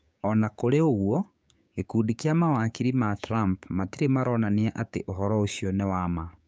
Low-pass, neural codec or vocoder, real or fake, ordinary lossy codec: none; codec, 16 kHz, 6 kbps, DAC; fake; none